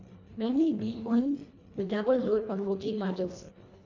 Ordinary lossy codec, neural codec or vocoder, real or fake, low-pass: none; codec, 24 kHz, 1.5 kbps, HILCodec; fake; 7.2 kHz